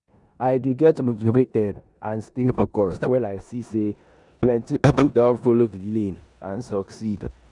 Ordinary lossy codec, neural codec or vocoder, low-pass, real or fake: none; codec, 16 kHz in and 24 kHz out, 0.9 kbps, LongCat-Audio-Codec, four codebook decoder; 10.8 kHz; fake